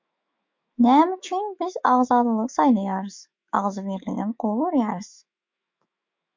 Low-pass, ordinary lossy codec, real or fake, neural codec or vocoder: 7.2 kHz; MP3, 48 kbps; fake; autoencoder, 48 kHz, 128 numbers a frame, DAC-VAE, trained on Japanese speech